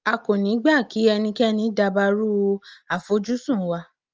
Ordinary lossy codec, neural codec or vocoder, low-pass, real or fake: Opus, 24 kbps; none; 7.2 kHz; real